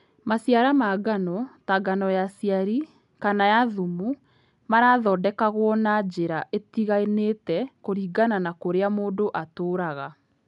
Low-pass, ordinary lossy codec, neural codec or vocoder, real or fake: 10.8 kHz; none; none; real